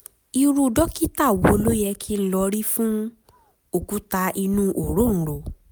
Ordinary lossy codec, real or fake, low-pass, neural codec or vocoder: none; real; none; none